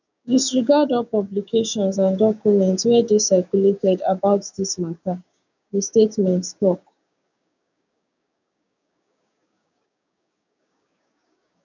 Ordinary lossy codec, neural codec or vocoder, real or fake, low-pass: none; codec, 16 kHz, 6 kbps, DAC; fake; 7.2 kHz